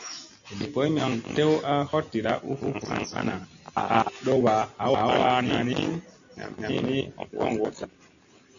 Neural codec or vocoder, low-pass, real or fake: none; 7.2 kHz; real